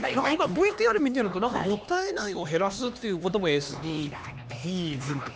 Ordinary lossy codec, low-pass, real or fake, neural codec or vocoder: none; none; fake; codec, 16 kHz, 2 kbps, X-Codec, HuBERT features, trained on LibriSpeech